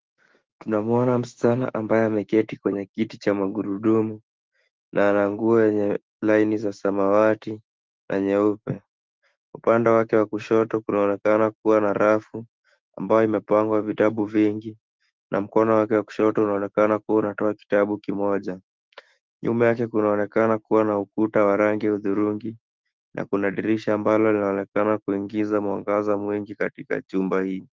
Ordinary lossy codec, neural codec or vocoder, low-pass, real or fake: Opus, 16 kbps; autoencoder, 48 kHz, 128 numbers a frame, DAC-VAE, trained on Japanese speech; 7.2 kHz; fake